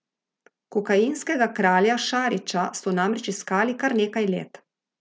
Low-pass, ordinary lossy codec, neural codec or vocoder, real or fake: none; none; none; real